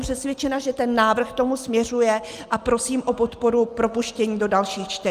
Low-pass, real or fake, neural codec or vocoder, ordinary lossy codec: 14.4 kHz; real; none; Opus, 32 kbps